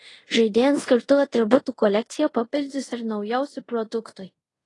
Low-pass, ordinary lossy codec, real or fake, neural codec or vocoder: 10.8 kHz; AAC, 32 kbps; fake; codec, 24 kHz, 0.5 kbps, DualCodec